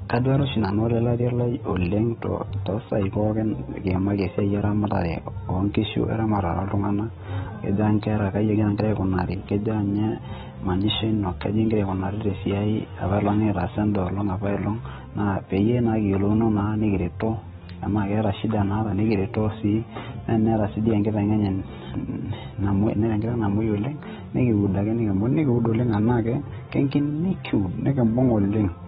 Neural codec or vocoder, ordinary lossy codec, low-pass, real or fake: none; AAC, 16 kbps; 19.8 kHz; real